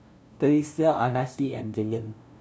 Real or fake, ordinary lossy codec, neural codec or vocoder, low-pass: fake; none; codec, 16 kHz, 0.5 kbps, FunCodec, trained on LibriTTS, 25 frames a second; none